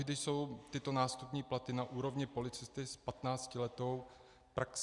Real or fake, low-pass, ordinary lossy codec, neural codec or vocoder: real; 10.8 kHz; Opus, 64 kbps; none